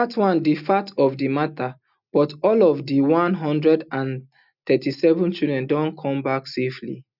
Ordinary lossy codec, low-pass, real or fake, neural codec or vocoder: none; 5.4 kHz; real; none